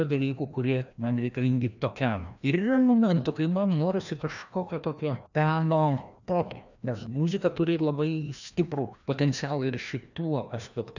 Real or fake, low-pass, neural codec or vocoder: fake; 7.2 kHz; codec, 16 kHz, 1 kbps, FreqCodec, larger model